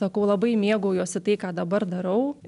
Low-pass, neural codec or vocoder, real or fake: 10.8 kHz; none; real